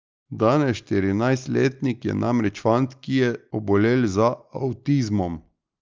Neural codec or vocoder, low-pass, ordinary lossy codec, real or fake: none; 7.2 kHz; Opus, 24 kbps; real